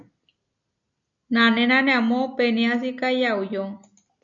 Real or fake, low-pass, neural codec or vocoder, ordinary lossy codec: real; 7.2 kHz; none; MP3, 96 kbps